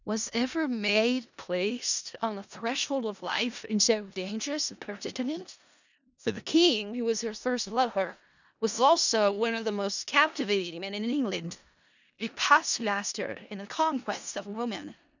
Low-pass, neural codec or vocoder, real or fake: 7.2 kHz; codec, 16 kHz in and 24 kHz out, 0.4 kbps, LongCat-Audio-Codec, four codebook decoder; fake